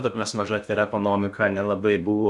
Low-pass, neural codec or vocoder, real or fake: 10.8 kHz; codec, 16 kHz in and 24 kHz out, 0.6 kbps, FocalCodec, streaming, 2048 codes; fake